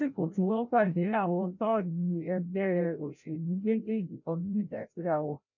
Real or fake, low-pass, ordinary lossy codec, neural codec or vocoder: fake; 7.2 kHz; none; codec, 16 kHz, 0.5 kbps, FreqCodec, larger model